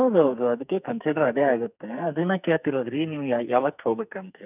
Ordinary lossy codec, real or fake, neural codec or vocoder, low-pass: none; fake; codec, 44.1 kHz, 2.6 kbps, SNAC; 3.6 kHz